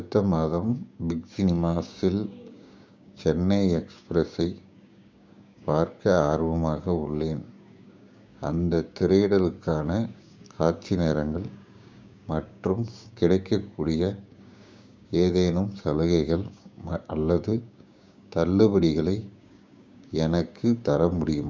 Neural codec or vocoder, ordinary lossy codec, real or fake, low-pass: codec, 16 kHz, 6 kbps, DAC; none; fake; none